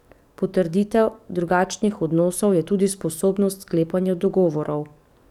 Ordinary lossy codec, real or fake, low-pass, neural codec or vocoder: none; fake; 19.8 kHz; codec, 44.1 kHz, 7.8 kbps, DAC